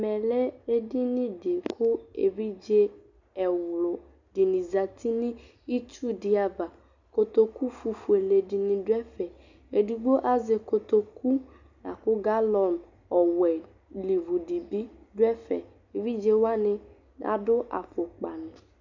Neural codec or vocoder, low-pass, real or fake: none; 7.2 kHz; real